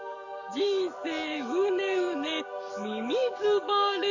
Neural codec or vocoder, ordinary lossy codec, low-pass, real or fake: codec, 44.1 kHz, 7.8 kbps, DAC; none; 7.2 kHz; fake